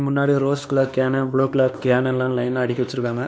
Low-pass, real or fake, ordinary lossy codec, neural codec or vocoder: none; fake; none; codec, 16 kHz, 2 kbps, X-Codec, WavLM features, trained on Multilingual LibriSpeech